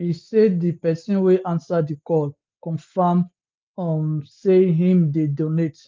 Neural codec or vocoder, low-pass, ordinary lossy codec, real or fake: none; 7.2 kHz; Opus, 32 kbps; real